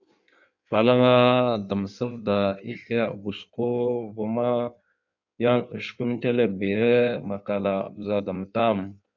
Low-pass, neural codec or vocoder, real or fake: 7.2 kHz; codec, 16 kHz in and 24 kHz out, 1.1 kbps, FireRedTTS-2 codec; fake